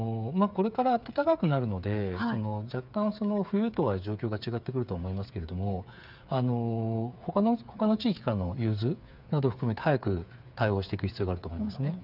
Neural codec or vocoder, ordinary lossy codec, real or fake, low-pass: codec, 16 kHz, 8 kbps, FreqCodec, smaller model; none; fake; 5.4 kHz